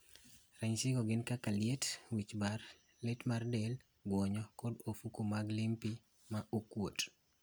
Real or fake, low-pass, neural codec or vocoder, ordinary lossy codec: real; none; none; none